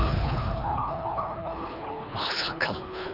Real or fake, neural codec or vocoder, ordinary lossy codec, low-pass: fake; codec, 24 kHz, 3 kbps, HILCodec; none; 5.4 kHz